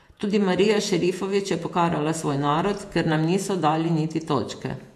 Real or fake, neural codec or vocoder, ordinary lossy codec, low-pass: fake; vocoder, 48 kHz, 128 mel bands, Vocos; MP3, 64 kbps; 14.4 kHz